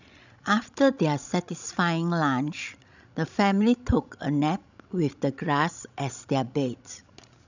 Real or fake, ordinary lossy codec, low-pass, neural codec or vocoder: fake; none; 7.2 kHz; codec, 16 kHz, 16 kbps, FreqCodec, larger model